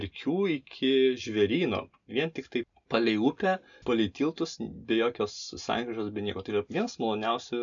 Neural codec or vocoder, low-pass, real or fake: none; 7.2 kHz; real